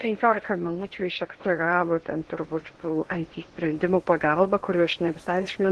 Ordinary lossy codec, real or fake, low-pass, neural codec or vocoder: Opus, 16 kbps; fake; 10.8 kHz; codec, 16 kHz in and 24 kHz out, 0.8 kbps, FocalCodec, streaming, 65536 codes